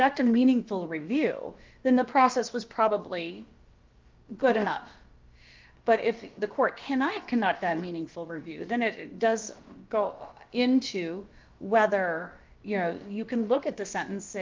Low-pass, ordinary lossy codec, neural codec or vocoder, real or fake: 7.2 kHz; Opus, 32 kbps; codec, 16 kHz, about 1 kbps, DyCAST, with the encoder's durations; fake